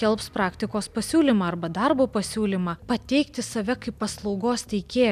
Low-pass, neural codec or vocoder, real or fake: 14.4 kHz; none; real